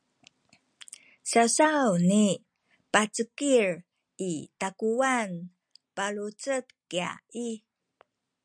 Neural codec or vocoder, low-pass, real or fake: none; 9.9 kHz; real